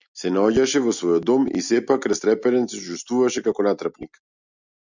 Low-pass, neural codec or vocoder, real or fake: 7.2 kHz; none; real